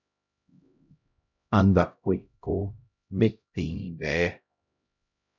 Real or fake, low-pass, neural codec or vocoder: fake; 7.2 kHz; codec, 16 kHz, 0.5 kbps, X-Codec, HuBERT features, trained on LibriSpeech